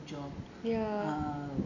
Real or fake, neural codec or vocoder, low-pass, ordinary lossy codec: real; none; 7.2 kHz; none